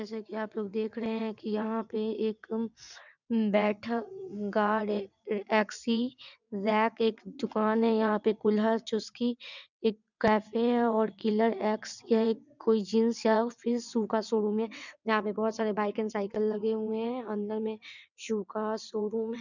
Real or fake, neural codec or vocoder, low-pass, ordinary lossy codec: fake; vocoder, 22.05 kHz, 80 mel bands, WaveNeXt; 7.2 kHz; none